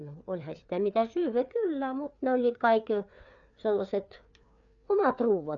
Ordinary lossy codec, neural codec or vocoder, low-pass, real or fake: none; codec, 16 kHz, 4 kbps, FreqCodec, larger model; 7.2 kHz; fake